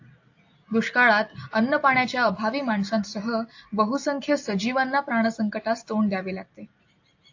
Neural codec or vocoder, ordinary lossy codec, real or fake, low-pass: none; AAC, 48 kbps; real; 7.2 kHz